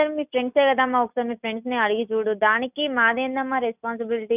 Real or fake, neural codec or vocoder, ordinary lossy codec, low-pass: real; none; none; 3.6 kHz